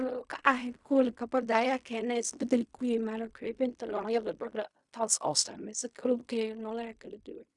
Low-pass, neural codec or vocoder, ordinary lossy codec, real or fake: 10.8 kHz; codec, 16 kHz in and 24 kHz out, 0.4 kbps, LongCat-Audio-Codec, fine tuned four codebook decoder; none; fake